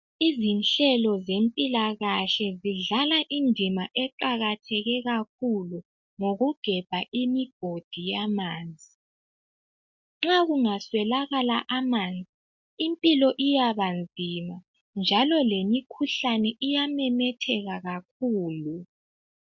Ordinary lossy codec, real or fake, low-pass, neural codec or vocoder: MP3, 64 kbps; real; 7.2 kHz; none